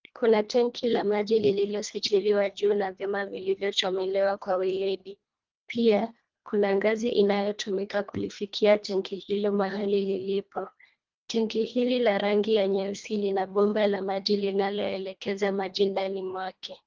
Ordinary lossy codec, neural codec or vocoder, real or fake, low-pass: Opus, 32 kbps; codec, 24 kHz, 1.5 kbps, HILCodec; fake; 7.2 kHz